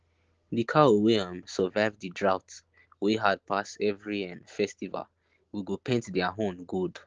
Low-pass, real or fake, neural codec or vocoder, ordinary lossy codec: 7.2 kHz; real; none; Opus, 16 kbps